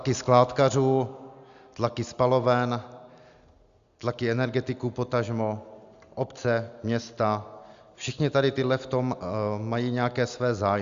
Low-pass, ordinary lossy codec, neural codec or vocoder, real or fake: 7.2 kHz; Opus, 64 kbps; none; real